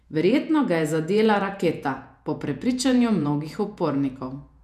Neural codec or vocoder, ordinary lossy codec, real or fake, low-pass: vocoder, 48 kHz, 128 mel bands, Vocos; none; fake; 14.4 kHz